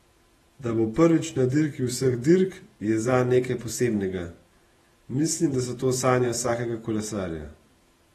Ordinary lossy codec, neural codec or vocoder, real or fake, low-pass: AAC, 32 kbps; vocoder, 48 kHz, 128 mel bands, Vocos; fake; 19.8 kHz